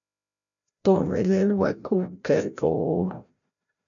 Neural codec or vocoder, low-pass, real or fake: codec, 16 kHz, 0.5 kbps, FreqCodec, larger model; 7.2 kHz; fake